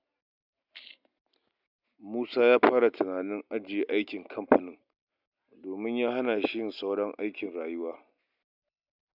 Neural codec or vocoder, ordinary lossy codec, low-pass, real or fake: none; none; 5.4 kHz; real